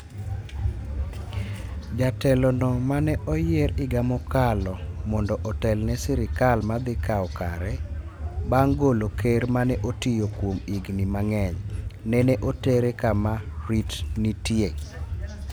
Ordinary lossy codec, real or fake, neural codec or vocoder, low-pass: none; real; none; none